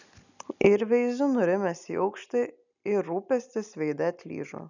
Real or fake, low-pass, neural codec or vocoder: real; 7.2 kHz; none